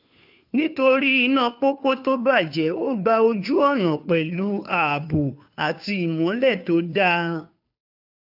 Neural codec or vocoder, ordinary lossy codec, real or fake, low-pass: codec, 16 kHz, 2 kbps, FunCodec, trained on Chinese and English, 25 frames a second; AAC, 48 kbps; fake; 5.4 kHz